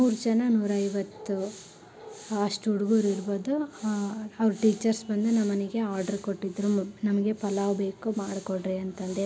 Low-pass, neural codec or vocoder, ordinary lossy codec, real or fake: none; none; none; real